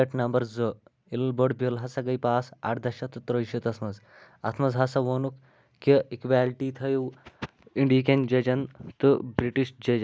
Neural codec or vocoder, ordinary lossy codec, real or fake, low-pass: none; none; real; none